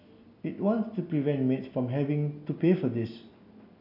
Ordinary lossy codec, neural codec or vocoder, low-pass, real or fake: none; none; 5.4 kHz; real